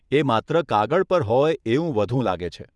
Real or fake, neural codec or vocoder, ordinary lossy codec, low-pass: fake; vocoder, 22.05 kHz, 80 mel bands, WaveNeXt; none; none